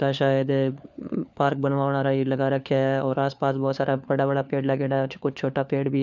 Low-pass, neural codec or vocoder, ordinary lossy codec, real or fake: none; codec, 16 kHz, 4 kbps, FunCodec, trained on LibriTTS, 50 frames a second; none; fake